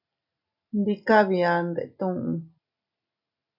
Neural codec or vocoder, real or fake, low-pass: none; real; 5.4 kHz